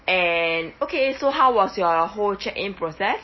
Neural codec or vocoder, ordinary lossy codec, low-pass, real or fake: none; MP3, 24 kbps; 7.2 kHz; real